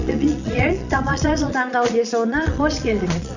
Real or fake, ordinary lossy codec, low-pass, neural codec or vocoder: fake; none; 7.2 kHz; vocoder, 22.05 kHz, 80 mel bands, Vocos